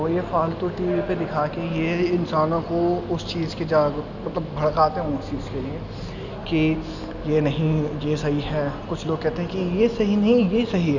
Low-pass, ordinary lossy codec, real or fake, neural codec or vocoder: 7.2 kHz; none; real; none